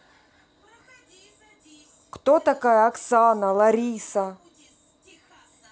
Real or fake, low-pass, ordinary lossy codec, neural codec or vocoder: real; none; none; none